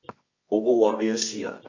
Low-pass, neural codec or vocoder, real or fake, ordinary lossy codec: 7.2 kHz; codec, 24 kHz, 0.9 kbps, WavTokenizer, medium music audio release; fake; MP3, 48 kbps